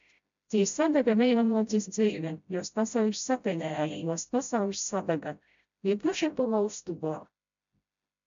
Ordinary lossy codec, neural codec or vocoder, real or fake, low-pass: MP3, 64 kbps; codec, 16 kHz, 0.5 kbps, FreqCodec, smaller model; fake; 7.2 kHz